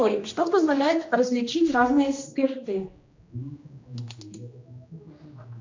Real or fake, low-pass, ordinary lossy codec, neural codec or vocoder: fake; 7.2 kHz; MP3, 64 kbps; codec, 16 kHz, 1 kbps, X-Codec, HuBERT features, trained on general audio